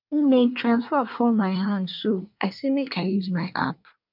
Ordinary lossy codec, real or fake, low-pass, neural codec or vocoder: none; fake; 5.4 kHz; codec, 24 kHz, 1 kbps, SNAC